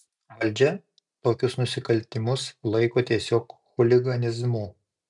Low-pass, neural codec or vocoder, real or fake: 10.8 kHz; none; real